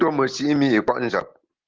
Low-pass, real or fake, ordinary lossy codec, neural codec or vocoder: 7.2 kHz; real; Opus, 16 kbps; none